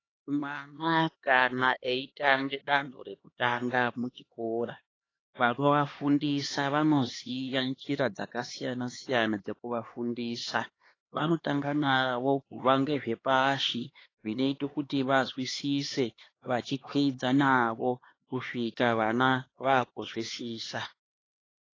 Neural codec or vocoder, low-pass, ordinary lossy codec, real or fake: codec, 16 kHz, 2 kbps, X-Codec, HuBERT features, trained on LibriSpeech; 7.2 kHz; AAC, 32 kbps; fake